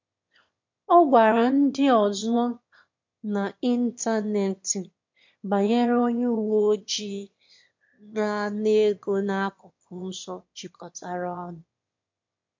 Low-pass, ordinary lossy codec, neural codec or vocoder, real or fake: 7.2 kHz; MP3, 48 kbps; autoencoder, 22.05 kHz, a latent of 192 numbers a frame, VITS, trained on one speaker; fake